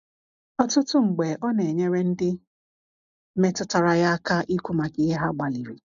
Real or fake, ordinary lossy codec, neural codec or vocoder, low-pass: real; none; none; 7.2 kHz